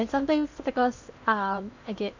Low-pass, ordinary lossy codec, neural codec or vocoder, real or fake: 7.2 kHz; none; codec, 16 kHz in and 24 kHz out, 0.8 kbps, FocalCodec, streaming, 65536 codes; fake